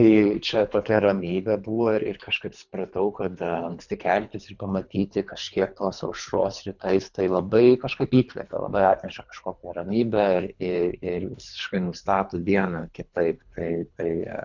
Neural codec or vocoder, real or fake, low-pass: codec, 24 kHz, 3 kbps, HILCodec; fake; 7.2 kHz